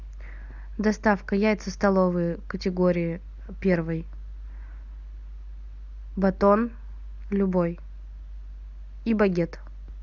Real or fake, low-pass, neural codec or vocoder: real; 7.2 kHz; none